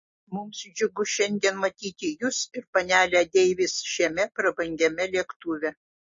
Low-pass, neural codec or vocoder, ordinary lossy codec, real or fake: 7.2 kHz; none; MP3, 32 kbps; real